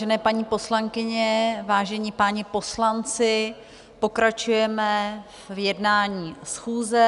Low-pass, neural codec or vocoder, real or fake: 10.8 kHz; none; real